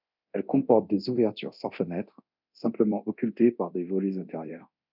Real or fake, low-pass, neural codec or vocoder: fake; 5.4 kHz; codec, 24 kHz, 0.9 kbps, DualCodec